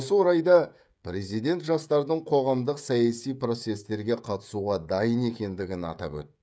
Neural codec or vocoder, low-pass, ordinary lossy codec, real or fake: codec, 16 kHz, 16 kbps, FreqCodec, smaller model; none; none; fake